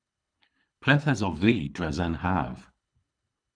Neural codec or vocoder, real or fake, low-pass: codec, 24 kHz, 3 kbps, HILCodec; fake; 9.9 kHz